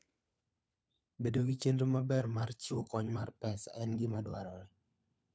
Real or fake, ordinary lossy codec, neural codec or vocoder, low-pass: fake; none; codec, 16 kHz, 4 kbps, FunCodec, trained on LibriTTS, 50 frames a second; none